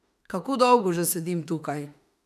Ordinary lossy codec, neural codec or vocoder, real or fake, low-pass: none; autoencoder, 48 kHz, 32 numbers a frame, DAC-VAE, trained on Japanese speech; fake; 14.4 kHz